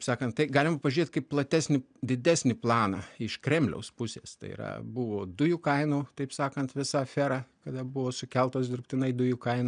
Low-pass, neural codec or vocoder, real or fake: 9.9 kHz; none; real